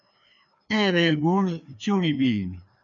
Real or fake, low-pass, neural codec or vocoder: fake; 7.2 kHz; codec, 16 kHz, 2 kbps, FreqCodec, larger model